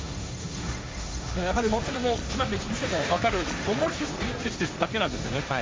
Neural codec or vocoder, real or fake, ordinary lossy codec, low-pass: codec, 16 kHz, 1.1 kbps, Voila-Tokenizer; fake; none; none